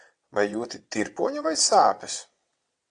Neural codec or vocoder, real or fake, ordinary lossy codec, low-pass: vocoder, 22.05 kHz, 80 mel bands, WaveNeXt; fake; AAC, 64 kbps; 9.9 kHz